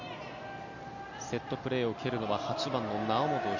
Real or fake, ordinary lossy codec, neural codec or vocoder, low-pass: real; none; none; 7.2 kHz